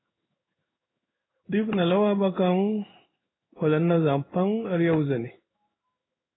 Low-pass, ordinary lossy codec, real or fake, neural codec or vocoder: 7.2 kHz; AAC, 16 kbps; fake; codec, 16 kHz in and 24 kHz out, 1 kbps, XY-Tokenizer